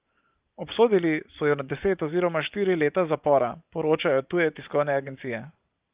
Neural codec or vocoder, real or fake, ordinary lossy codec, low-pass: vocoder, 44.1 kHz, 128 mel bands every 512 samples, BigVGAN v2; fake; Opus, 32 kbps; 3.6 kHz